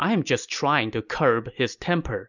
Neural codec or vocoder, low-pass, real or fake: none; 7.2 kHz; real